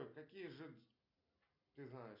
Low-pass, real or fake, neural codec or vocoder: 5.4 kHz; real; none